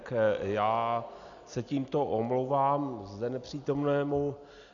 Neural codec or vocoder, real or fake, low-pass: none; real; 7.2 kHz